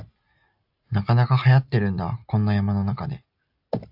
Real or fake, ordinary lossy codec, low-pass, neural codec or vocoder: real; AAC, 48 kbps; 5.4 kHz; none